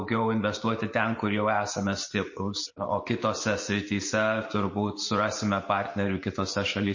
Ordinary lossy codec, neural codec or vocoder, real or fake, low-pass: MP3, 32 kbps; none; real; 7.2 kHz